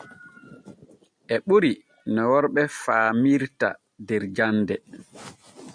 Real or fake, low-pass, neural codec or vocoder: real; 9.9 kHz; none